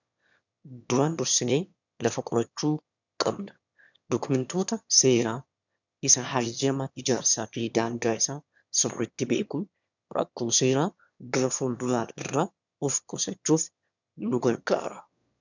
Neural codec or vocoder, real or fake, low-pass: autoencoder, 22.05 kHz, a latent of 192 numbers a frame, VITS, trained on one speaker; fake; 7.2 kHz